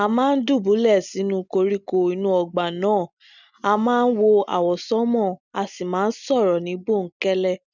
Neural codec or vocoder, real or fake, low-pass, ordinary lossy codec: none; real; 7.2 kHz; none